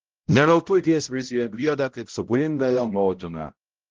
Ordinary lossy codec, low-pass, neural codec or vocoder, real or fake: Opus, 16 kbps; 7.2 kHz; codec, 16 kHz, 0.5 kbps, X-Codec, HuBERT features, trained on balanced general audio; fake